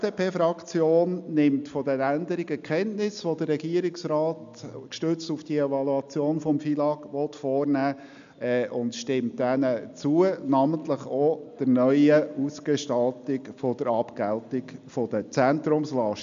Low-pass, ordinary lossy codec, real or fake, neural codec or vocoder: 7.2 kHz; MP3, 64 kbps; real; none